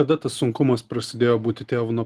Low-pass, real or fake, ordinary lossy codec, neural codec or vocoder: 14.4 kHz; real; Opus, 24 kbps; none